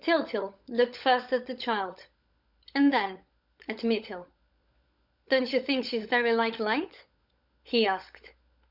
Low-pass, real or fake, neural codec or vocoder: 5.4 kHz; fake; codec, 16 kHz, 16 kbps, FunCodec, trained on LibriTTS, 50 frames a second